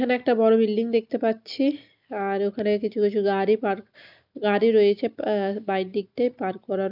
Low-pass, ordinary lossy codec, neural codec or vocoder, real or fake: 5.4 kHz; none; none; real